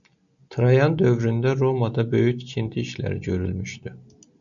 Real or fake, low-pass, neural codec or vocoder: real; 7.2 kHz; none